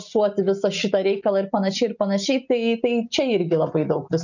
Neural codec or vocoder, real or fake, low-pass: none; real; 7.2 kHz